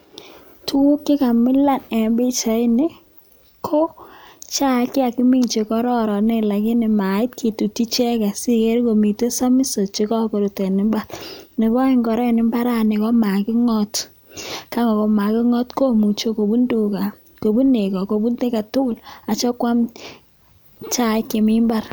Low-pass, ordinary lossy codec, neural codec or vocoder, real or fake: none; none; none; real